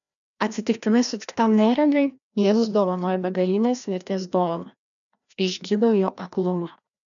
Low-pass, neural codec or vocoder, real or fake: 7.2 kHz; codec, 16 kHz, 1 kbps, FreqCodec, larger model; fake